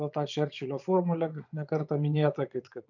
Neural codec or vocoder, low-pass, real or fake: vocoder, 22.05 kHz, 80 mel bands, Vocos; 7.2 kHz; fake